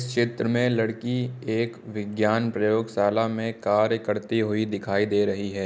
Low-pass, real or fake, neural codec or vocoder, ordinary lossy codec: none; real; none; none